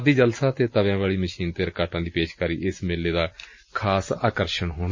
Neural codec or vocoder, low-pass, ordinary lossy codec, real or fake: none; 7.2 kHz; MP3, 32 kbps; real